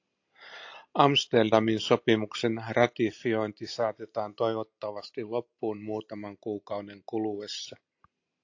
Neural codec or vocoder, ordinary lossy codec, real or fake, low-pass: none; AAC, 48 kbps; real; 7.2 kHz